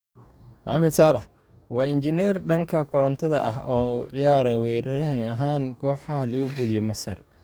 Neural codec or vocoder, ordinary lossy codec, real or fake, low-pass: codec, 44.1 kHz, 2.6 kbps, DAC; none; fake; none